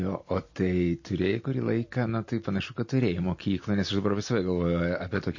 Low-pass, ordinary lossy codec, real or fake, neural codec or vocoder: 7.2 kHz; MP3, 32 kbps; real; none